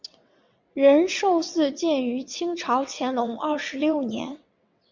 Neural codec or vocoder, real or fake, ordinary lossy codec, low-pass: vocoder, 22.05 kHz, 80 mel bands, WaveNeXt; fake; MP3, 64 kbps; 7.2 kHz